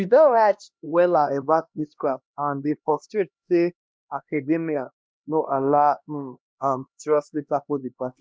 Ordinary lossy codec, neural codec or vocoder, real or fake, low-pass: none; codec, 16 kHz, 1 kbps, X-Codec, HuBERT features, trained on LibriSpeech; fake; none